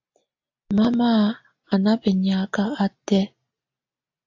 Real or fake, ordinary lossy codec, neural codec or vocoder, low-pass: real; AAC, 48 kbps; none; 7.2 kHz